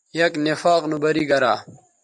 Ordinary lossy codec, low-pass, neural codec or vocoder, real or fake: MP3, 96 kbps; 10.8 kHz; vocoder, 44.1 kHz, 128 mel bands every 256 samples, BigVGAN v2; fake